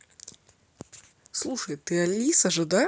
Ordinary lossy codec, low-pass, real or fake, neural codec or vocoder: none; none; real; none